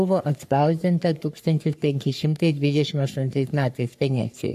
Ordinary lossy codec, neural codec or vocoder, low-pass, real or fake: AAC, 96 kbps; codec, 44.1 kHz, 3.4 kbps, Pupu-Codec; 14.4 kHz; fake